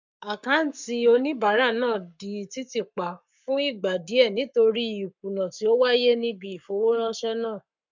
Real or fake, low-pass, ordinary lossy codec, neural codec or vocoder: fake; 7.2 kHz; MP3, 64 kbps; codec, 44.1 kHz, 7.8 kbps, Pupu-Codec